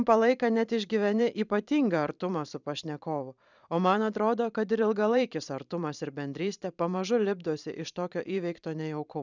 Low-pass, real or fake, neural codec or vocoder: 7.2 kHz; fake; vocoder, 44.1 kHz, 128 mel bands every 512 samples, BigVGAN v2